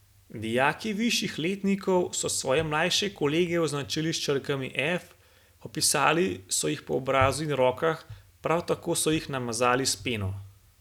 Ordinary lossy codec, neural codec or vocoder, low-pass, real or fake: none; none; 19.8 kHz; real